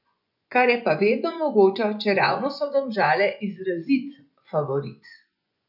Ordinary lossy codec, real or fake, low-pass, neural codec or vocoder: none; fake; 5.4 kHz; vocoder, 24 kHz, 100 mel bands, Vocos